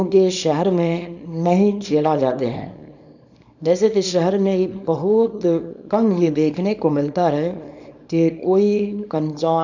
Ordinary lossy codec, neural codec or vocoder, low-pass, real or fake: none; codec, 24 kHz, 0.9 kbps, WavTokenizer, small release; 7.2 kHz; fake